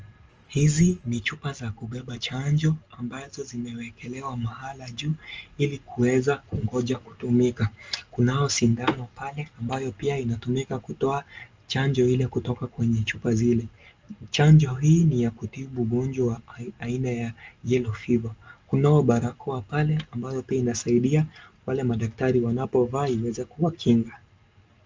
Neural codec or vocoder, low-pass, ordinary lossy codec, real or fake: none; 7.2 kHz; Opus, 24 kbps; real